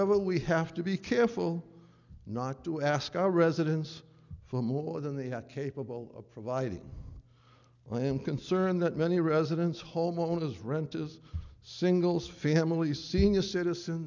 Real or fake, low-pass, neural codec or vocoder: real; 7.2 kHz; none